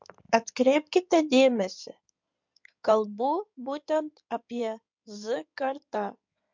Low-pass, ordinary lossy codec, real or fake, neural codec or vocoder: 7.2 kHz; MP3, 64 kbps; fake; codec, 16 kHz in and 24 kHz out, 2.2 kbps, FireRedTTS-2 codec